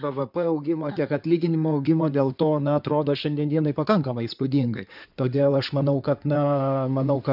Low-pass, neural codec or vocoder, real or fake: 5.4 kHz; codec, 16 kHz in and 24 kHz out, 2.2 kbps, FireRedTTS-2 codec; fake